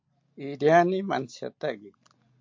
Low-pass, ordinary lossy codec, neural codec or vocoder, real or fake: 7.2 kHz; MP3, 48 kbps; none; real